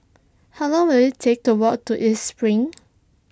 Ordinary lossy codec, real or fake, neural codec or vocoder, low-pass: none; real; none; none